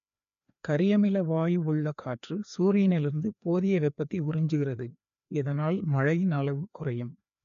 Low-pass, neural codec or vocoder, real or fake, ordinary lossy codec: 7.2 kHz; codec, 16 kHz, 2 kbps, FreqCodec, larger model; fake; none